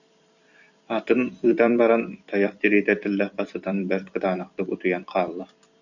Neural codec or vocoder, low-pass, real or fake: vocoder, 44.1 kHz, 128 mel bands every 256 samples, BigVGAN v2; 7.2 kHz; fake